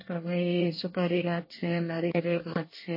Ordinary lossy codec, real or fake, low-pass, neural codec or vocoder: MP3, 24 kbps; fake; 5.4 kHz; codec, 24 kHz, 1 kbps, SNAC